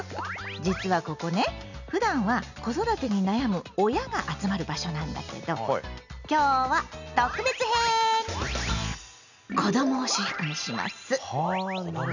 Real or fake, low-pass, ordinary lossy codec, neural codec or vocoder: real; 7.2 kHz; none; none